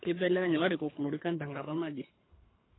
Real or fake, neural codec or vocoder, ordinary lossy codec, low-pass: fake; codec, 24 kHz, 3 kbps, HILCodec; AAC, 16 kbps; 7.2 kHz